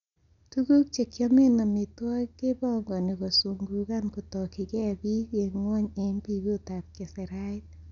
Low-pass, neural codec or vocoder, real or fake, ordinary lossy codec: 7.2 kHz; none; real; none